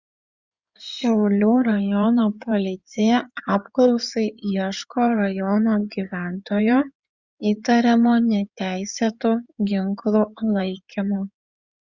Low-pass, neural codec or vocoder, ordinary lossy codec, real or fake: 7.2 kHz; codec, 16 kHz in and 24 kHz out, 2.2 kbps, FireRedTTS-2 codec; Opus, 64 kbps; fake